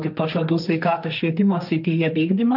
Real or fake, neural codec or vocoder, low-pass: fake; codec, 16 kHz, 1.1 kbps, Voila-Tokenizer; 5.4 kHz